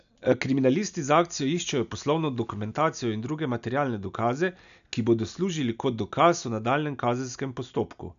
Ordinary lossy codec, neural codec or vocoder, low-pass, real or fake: none; none; 7.2 kHz; real